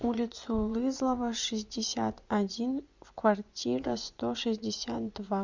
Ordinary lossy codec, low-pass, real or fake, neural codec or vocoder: Opus, 64 kbps; 7.2 kHz; fake; vocoder, 24 kHz, 100 mel bands, Vocos